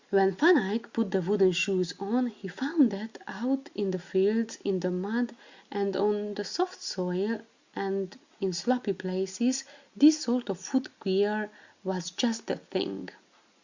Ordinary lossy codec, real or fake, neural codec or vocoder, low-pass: Opus, 64 kbps; real; none; 7.2 kHz